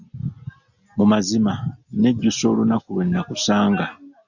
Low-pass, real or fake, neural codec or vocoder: 7.2 kHz; real; none